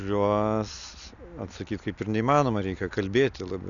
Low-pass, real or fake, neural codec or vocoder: 7.2 kHz; real; none